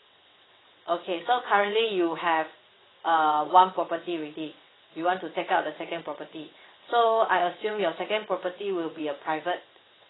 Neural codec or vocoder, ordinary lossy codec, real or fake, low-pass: vocoder, 22.05 kHz, 80 mel bands, WaveNeXt; AAC, 16 kbps; fake; 7.2 kHz